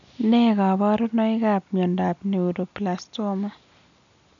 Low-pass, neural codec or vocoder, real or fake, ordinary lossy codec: 7.2 kHz; none; real; none